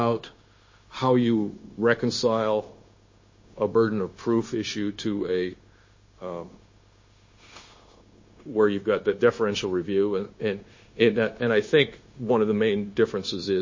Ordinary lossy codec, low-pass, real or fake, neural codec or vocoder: MP3, 32 kbps; 7.2 kHz; fake; codec, 16 kHz, 0.9 kbps, LongCat-Audio-Codec